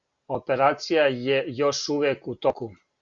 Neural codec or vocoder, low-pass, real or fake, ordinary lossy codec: none; 7.2 kHz; real; Opus, 64 kbps